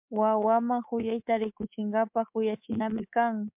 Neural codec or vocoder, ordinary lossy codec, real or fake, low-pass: codec, 24 kHz, 3.1 kbps, DualCodec; MP3, 32 kbps; fake; 3.6 kHz